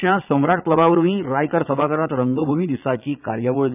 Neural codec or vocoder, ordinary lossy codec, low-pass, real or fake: vocoder, 22.05 kHz, 80 mel bands, Vocos; none; 3.6 kHz; fake